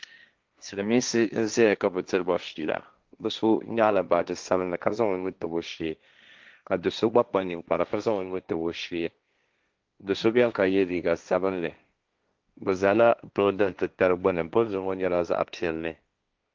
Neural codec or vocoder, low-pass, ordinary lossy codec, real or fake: codec, 16 kHz, 1.1 kbps, Voila-Tokenizer; 7.2 kHz; Opus, 32 kbps; fake